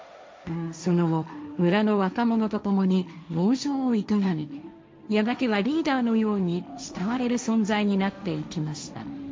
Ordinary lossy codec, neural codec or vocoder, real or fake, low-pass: none; codec, 16 kHz, 1.1 kbps, Voila-Tokenizer; fake; none